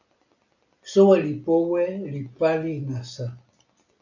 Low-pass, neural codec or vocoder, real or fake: 7.2 kHz; none; real